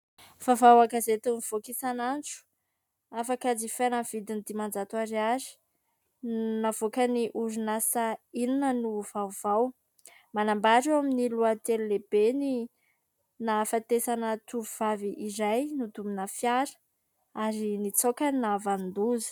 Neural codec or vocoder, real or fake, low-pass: none; real; 19.8 kHz